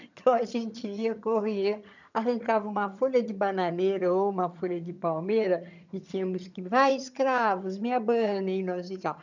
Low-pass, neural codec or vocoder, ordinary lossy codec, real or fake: 7.2 kHz; vocoder, 22.05 kHz, 80 mel bands, HiFi-GAN; none; fake